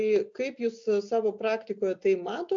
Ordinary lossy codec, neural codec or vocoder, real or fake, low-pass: AAC, 64 kbps; none; real; 7.2 kHz